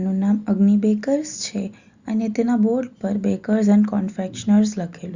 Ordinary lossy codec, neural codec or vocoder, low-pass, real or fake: Opus, 64 kbps; none; 7.2 kHz; real